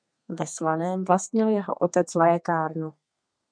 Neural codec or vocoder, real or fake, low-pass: codec, 32 kHz, 1.9 kbps, SNAC; fake; 9.9 kHz